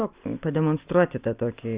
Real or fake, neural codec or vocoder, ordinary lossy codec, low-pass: real; none; Opus, 64 kbps; 3.6 kHz